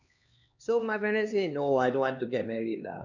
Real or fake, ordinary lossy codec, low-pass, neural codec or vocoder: fake; none; 7.2 kHz; codec, 16 kHz, 2 kbps, X-Codec, HuBERT features, trained on LibriSpeech